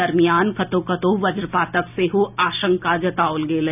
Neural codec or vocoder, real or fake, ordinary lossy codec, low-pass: none; real; none; 3.6 kHz